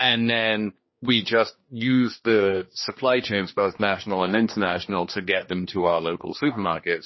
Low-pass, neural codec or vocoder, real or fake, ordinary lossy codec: 7.2 kHz; codec, 16 kHz, 2 kbps, X-Codec, HuBERT features, trained on general audio; fake; MP3, 24 kbps